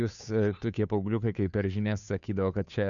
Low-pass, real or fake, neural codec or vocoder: 7.2 kHz; fake; codec, 16 kHz, 2 kbps, FunCodec, trained on Chinese and English, 25 frames a second